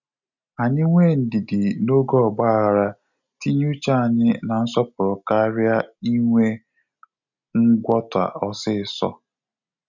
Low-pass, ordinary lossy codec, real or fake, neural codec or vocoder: 7.2 kHz; none; real; none